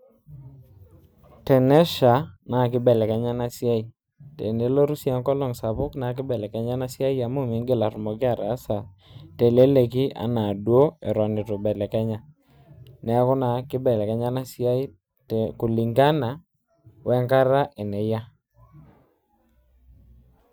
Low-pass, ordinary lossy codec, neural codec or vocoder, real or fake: none; none; none; real